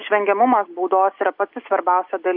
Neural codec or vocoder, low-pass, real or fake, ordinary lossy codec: none; 5.4 kHz; real; AAC, 48 kbps